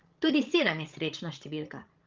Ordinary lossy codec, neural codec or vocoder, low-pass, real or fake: Opus, 32 kbps; codec, 16 kHz, 16 kbps, FreqCodec, larger model; 7.2 kHz; fake